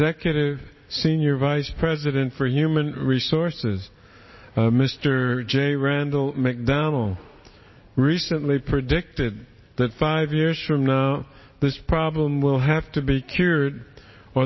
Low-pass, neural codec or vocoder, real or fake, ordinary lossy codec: 7.2 kHz; none; real; MP3, 24 kbps